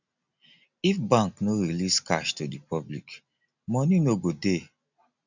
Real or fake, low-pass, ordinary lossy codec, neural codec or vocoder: real; 7.2 kHz; none; none